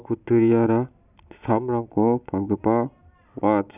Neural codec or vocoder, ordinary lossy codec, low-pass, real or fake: none; none; 3.6 kHz; real